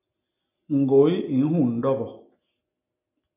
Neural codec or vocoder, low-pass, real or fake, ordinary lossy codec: none; 3.6 kHz; real; AAC, 32 kbps